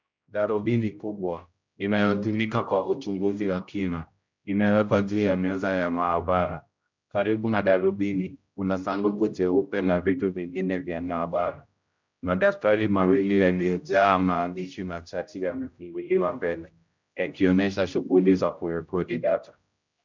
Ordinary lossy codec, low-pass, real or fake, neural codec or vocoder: MP3, 64 kbps; 7.2 kHz; fake; codec, 16 kHz, 0.5 kbps, X-Codec, HuBERT features, trained on general audio